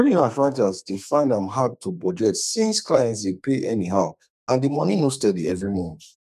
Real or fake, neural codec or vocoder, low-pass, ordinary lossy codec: fake; codec, 32 kHz, 1.9 kbps, SNAC; 14.4 kHz; none